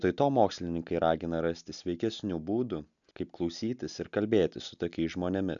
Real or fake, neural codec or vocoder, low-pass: real; none; 7.2 kHz